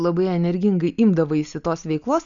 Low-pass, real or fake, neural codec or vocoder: 7.2 kHz; real; none